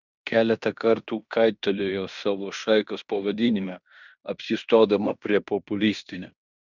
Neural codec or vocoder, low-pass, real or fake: codec, 16 kHz in and 24 kHz out, 0.9 kbps, LongCat-Audio-Codec, fine tuned four codebook decoder; 7.2 kHz; fake